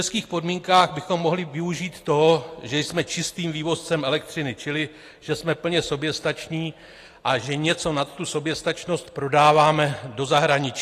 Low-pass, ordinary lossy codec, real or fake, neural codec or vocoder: 14.4 kHz; AAC, 48 kbps; real; none